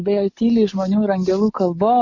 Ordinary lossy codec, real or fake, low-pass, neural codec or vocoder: MP3, 48 kbps; real; 7.2 kHz; none